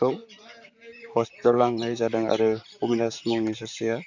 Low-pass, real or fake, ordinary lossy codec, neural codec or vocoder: 7.2 kHz; fake; none; vocoder, 44.1 kHz, 128 mel bands, Pupu-Vocoder